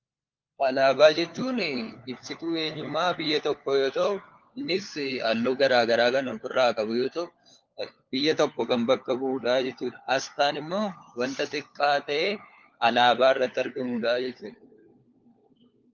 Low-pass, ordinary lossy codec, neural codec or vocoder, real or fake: 7.2 kHz; Opus, 32 kbps; codec, 16 kHz, 4 kbps, FunCodec, trained on LibriTTS, 50 frames a second; fake